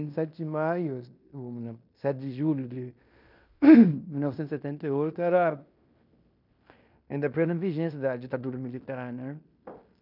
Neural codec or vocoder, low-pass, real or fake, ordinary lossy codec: codec, 16 kHz in and 24 kHz out, 0.9 kbps, LongCat-Audio-Codec, fine tuned four codebook decoder; 5.4 kHz; fake; none